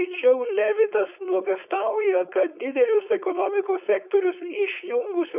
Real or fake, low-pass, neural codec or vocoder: fake; 3.6 kHz; codec, 16 kHz, 4.8 kbps, FACodec